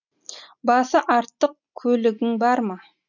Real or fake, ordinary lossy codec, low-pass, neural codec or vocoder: real; none; 7.2 kHz; none